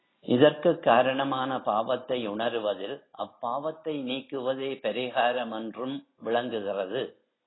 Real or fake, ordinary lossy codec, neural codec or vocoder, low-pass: real; AAC, 16 kbps; none; 7.2 kHz